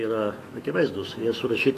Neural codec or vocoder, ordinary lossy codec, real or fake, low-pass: autoencoder, 48 kHz, 128 numbers a frame, DAC-VAE, trained on Japanese speech; AAC, 48 kbps; fake; 14.4 kHz